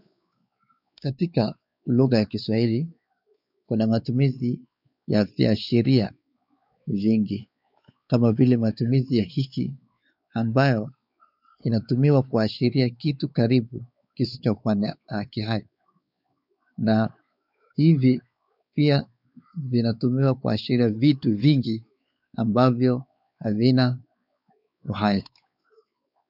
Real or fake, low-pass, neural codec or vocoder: fake; 5.4 kHz; codec, 16 kHz, 4 kbps, X-Codec, WavLM features, trained on Multilingual LibriSpeech